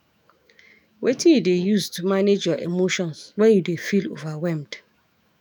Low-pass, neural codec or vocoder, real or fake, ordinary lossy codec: 19.8 kHz; autoencoder, 48 kHz, 128 numbers a frame, DAC-VAE, trained on Japanese speech; fake; none